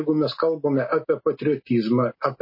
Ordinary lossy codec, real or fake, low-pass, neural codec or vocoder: MP3, 24 kbps; real; 5.4 kHz; none